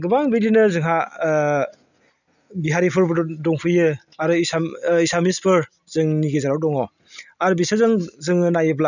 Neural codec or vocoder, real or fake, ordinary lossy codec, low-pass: none; real; none; 7.2 kHz